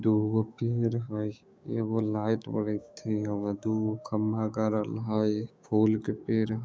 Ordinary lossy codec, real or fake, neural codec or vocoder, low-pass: none; fake; codec, 16 kHz, 6 kbps, DAC; none